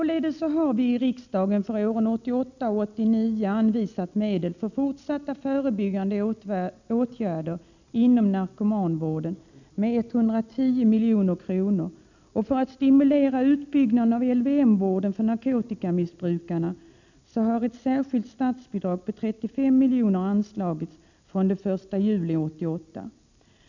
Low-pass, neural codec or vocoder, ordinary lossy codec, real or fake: 7.2 kHz; none; none; real